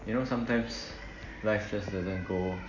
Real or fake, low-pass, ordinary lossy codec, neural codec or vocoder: real; 7.2 kHz; none; none